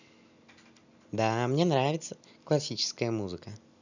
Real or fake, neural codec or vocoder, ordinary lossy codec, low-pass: real; none; none; 7.2 kHz